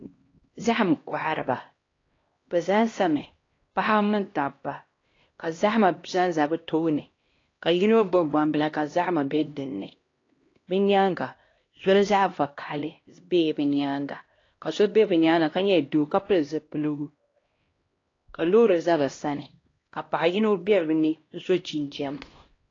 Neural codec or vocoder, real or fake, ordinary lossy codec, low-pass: codec, 16 kHz, 1 kbps, X-Codec, HuBERT features, trained on LibriSpeech; fake; AAC, 32 kbps; 7.2 kHz